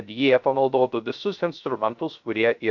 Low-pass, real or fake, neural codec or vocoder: 7.2 kHz; fake; codec, 16 kHz, 0.3 kbps, FocalCodec